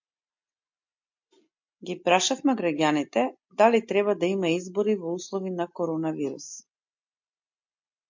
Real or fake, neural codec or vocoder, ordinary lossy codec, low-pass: real; none; MP3, 48 kbps; 7.2 kHz